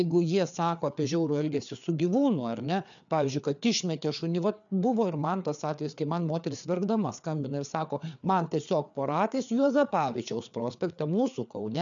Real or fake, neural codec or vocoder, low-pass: fake; codec, 16 kHz, 4 kbps, FreqCodec, larger model; 7.2 kHz